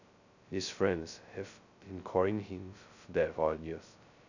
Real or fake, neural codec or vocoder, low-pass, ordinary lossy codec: fake; codec, 16 kHz, 0.2 kbps, FocalCodec; 7.2 kHz; none